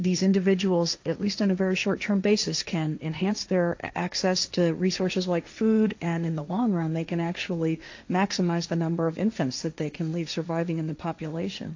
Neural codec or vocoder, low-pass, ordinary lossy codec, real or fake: codec, 16 kHz, 1.1 kbps, Voila-Tokenizer; 7.2 kHz; AAC, 48 kbps; fake